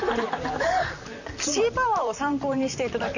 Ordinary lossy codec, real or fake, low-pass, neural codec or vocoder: none; fake; 7.2 kHz; vocoder, 44.1 kHz, 128 mel bands, Pupu-Vocoder